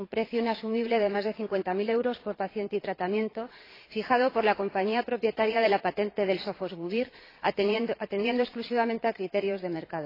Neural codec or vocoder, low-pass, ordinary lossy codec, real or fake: vocoder, 22.05 kHz, 80 mel bands, Vocos; 5.4 kHz; AAC, 24 kbps; fake